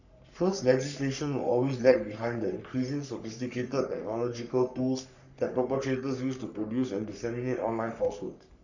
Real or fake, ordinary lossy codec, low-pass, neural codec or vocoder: fake; none; 7.2 kHz; codec, 44.1 kHz, 3.4 kbps, Pupu-Codec